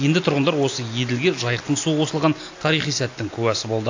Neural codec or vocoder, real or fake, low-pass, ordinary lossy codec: none; real; 7.2 kHz; AAC, 48 kbps